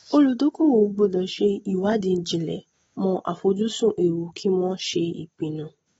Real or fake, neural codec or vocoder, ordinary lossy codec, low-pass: real; none; AAC, 24 kbps; 19.8 kHz